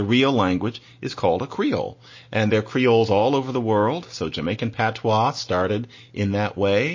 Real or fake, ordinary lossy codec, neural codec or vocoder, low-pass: real; MP3, 32 kbps; none; 7.2 kHz